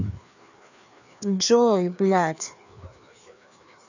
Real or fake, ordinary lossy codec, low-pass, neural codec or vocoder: fake; none; 7.2 kHz; codec, 16 kHz, 2 kbps, FreqCodec, larger model